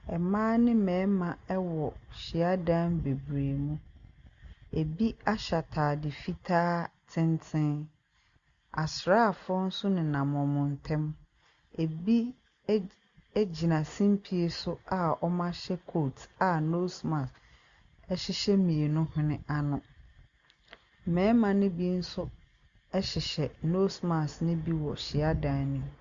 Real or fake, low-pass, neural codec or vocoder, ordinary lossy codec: real; 7.2 kHz; none; Opus, 64 kbps